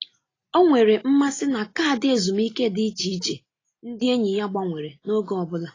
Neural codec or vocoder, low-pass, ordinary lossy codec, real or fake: none; 7.2 kHz; AAC, 32 kbps; real